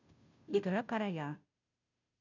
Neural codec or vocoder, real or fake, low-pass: codec, 16 kHz, 0.5 kbps, FunCodec, trained on Chinese and English, 25 frames a second; fake; 7.2 kHz